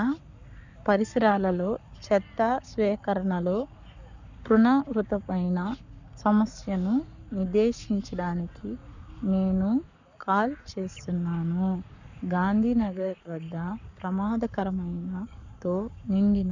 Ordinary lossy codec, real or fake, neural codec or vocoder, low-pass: none; fake; codec, 44.1 kHz, 7.8 kbps, Pupu-Codec; 7.2 kHz